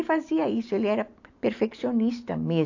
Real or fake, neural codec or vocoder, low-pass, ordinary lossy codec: real; none; 7.2 kHz; AAC, 48 kbps